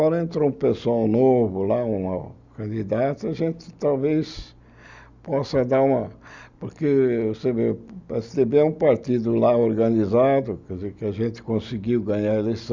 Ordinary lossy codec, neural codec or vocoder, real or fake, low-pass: Opus, 64 kbps; none; real; 7.2 kHz